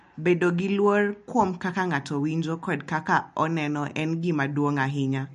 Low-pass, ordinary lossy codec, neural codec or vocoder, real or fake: 14.4 kHz; MP3, 48 kbps; none; real